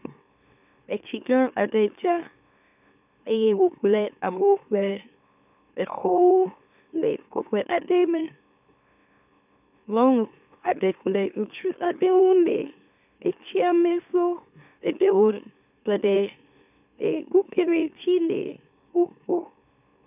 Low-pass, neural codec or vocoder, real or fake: 3.6 kHz; autoencoder, 44.1 kHz, a latent of 192 numbers a frame, MeloTTS; fake